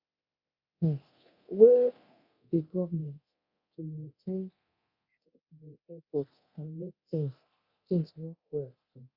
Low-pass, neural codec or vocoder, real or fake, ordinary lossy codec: 5.4 kHz; codec, 24 kHz, 0.9 kbps, DualCodec; fake; Opus, 64 kbps